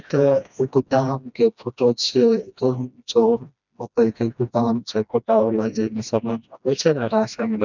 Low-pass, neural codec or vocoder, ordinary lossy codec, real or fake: 7.2 kHz; codec, 16 kHz, 1 kbps, FreqCodec, smaller model; none; fake